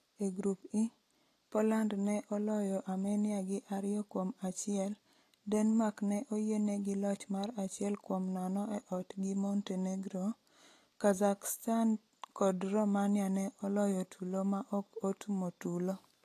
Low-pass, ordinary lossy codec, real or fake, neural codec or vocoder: 14.4 kHz; AAC, 48 kbps; real; none